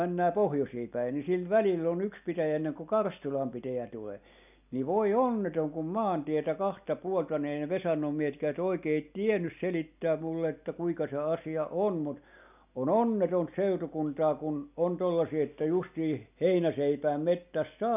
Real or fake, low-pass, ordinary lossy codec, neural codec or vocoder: real; 3.6 kHz; none; none